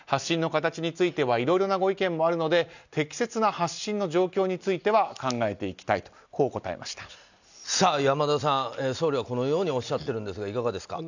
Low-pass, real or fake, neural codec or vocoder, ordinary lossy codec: 7.2 kHz; real; none; none